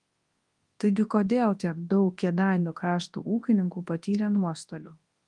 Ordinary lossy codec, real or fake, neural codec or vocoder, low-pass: Opus, 24 kbps; fake; codec, 24 kHz, 0.9 kbps, WavTokenizer, large speech release; 10.8 kHz